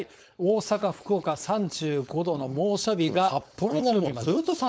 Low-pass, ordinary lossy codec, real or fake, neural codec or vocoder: none; none; fake; codec, 16 kHz, 4.8 kbps, FACodec